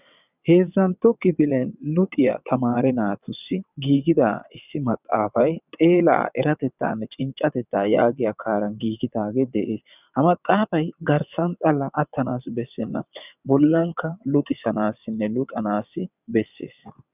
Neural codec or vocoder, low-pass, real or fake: vocoder, 22.05 kHz, 80 mel bands, WaveNeXt; 3.6 kHz; fake